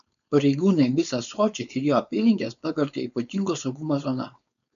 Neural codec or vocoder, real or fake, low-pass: codec, 16 kHz, 4.8 kbps, FACodec; fake; 7.2 kHz